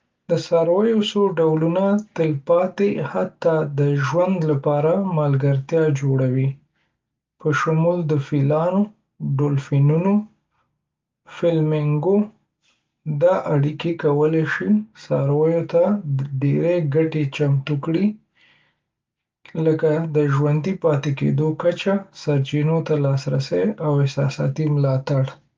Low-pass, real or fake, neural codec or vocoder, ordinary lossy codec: 7.2 kHz; real; none; Opus, 32 kbps